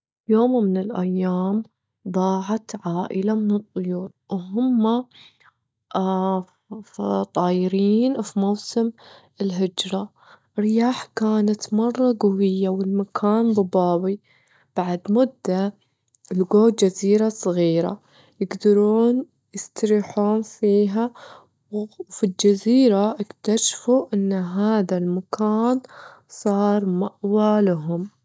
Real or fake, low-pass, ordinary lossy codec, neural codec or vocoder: real; none; none; none